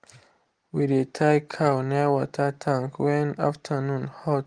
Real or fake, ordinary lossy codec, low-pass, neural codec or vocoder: real; Opus, 24 kbps; 9.9 kHz; none